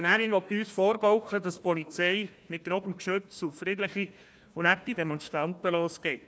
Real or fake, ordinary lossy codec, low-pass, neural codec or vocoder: fake; none; none; codec, 16 kHz, 1 kbps, FunCodec, trained on Chinese and English, 50 frames a second